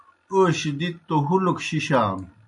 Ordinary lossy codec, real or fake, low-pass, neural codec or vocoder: MP3, 64 kbps; real; 10.8 kHz; none